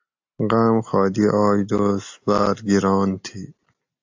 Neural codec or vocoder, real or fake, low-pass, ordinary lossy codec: none; real; 7.2 kHz; AAC, 48 kbps